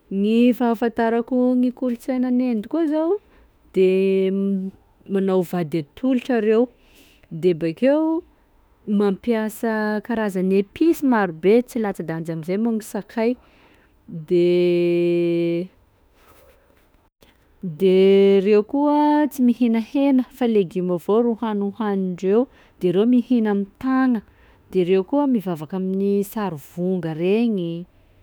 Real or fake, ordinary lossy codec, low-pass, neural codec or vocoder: fake; none; none; autoencoder, 48 kHz, 32 numbers a frame, DAC-VAE, trained on Japanese speech